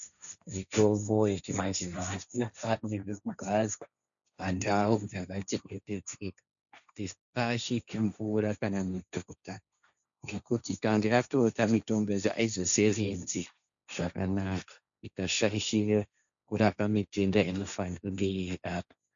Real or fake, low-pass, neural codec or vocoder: fake; 7.2 kHz; codec, 16 kHz, 1.1 kbps, Voila-Tokenizer